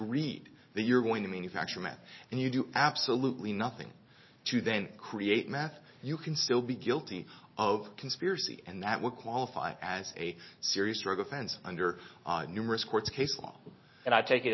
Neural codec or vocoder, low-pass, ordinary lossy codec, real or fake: none; 7.2 kHz; MP3, 24 kbps; real